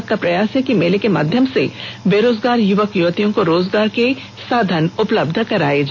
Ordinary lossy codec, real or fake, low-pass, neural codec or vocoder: none; real; none; none